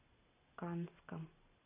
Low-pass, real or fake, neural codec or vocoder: 3.6 kHz; real; none